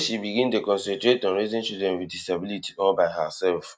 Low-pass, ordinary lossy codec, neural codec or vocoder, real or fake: none; none; none; real